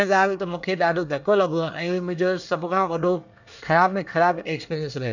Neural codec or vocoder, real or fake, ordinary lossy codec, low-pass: codec, 24 kHz, 1 kbps, SNAC; fake; none; 7.2 kHz